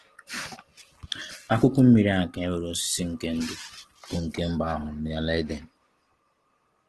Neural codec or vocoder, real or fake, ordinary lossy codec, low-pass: none; real; Opus, 16 kbps; 10.8 kHz